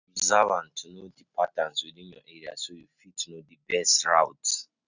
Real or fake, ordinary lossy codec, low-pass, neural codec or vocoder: real; none; 7.2 kHz; none